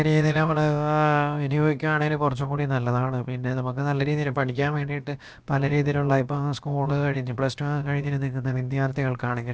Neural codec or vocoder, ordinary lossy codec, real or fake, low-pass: codec, 16 kHz, about 1 kbps, DyCAST, with the encoder's durations; none; fake; none